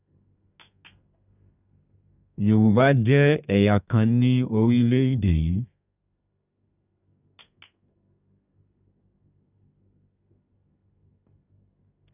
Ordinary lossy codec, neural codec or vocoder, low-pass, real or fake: none; codec, 32 kHz, 1.9 kbps, SNAC; 3.6 kHz; fake